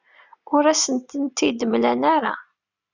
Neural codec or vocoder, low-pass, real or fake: none; 7.2 kHz; real